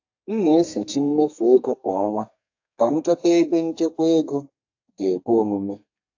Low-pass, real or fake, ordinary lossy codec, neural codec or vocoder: 7.2 kHz; fake; AAC, 48 kbps; codec, 32 kHz, 1.9 kbps, SNAC